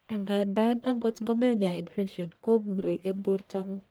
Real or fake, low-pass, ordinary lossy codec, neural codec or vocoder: fake; none; none; codec, 44.1 kHz, 1.7 kbps, Pupu-Codec